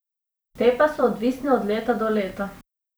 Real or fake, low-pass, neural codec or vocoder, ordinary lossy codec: fake; none; vocoder, 44.1 kHz, 128 mel bands every 256 samples, BigVGAN v2; none